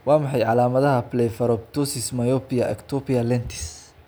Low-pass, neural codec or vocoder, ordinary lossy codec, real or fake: none; none; none; real